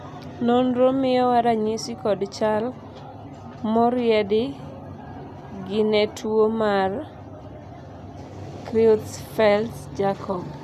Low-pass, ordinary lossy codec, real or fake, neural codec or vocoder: 14.4 kHz; none; real; none